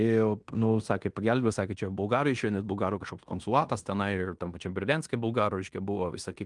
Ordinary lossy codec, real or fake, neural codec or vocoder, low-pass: Opus, 32 kbps; fake; codec, 16 kHz in and 24 kHz out, 0.9 kbps, LongCat-Audio-Codec, fine tuned four codebook decoder; 10.8 kHz